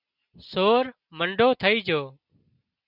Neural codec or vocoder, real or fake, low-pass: none; real; 5.4 kHz